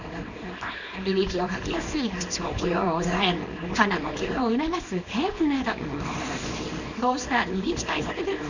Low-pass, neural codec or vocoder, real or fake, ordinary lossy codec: 7.2 kHz; codec, 24 kHz, 0.9 kbps, WavTokenizer, small release; fake; none